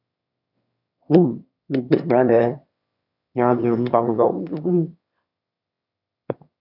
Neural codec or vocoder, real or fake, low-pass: autoencoder, 22.05 kHz, a latent of 192 numbers a frame, VITS, trained on one speaker; fake; 5.4 kHz